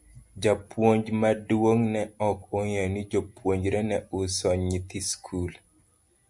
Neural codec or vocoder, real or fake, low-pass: none; real; 10.8 kHz